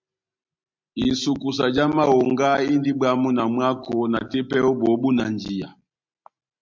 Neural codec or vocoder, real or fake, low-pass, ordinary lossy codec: none; real; 7.2 kHz; MP3, 64 kbps